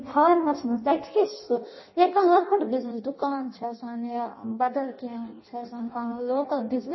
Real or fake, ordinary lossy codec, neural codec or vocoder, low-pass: fake; MP3, 24 kbps; codec, 16 kHz in and 24 kHz out, 0.6 kbps, FireRedTTS-2 codec; 7.2 kHz